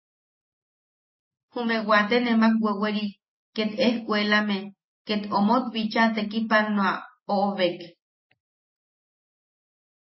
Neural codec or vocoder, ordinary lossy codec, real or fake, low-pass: none; MP3, 24 kbps; real; 7.2 kHz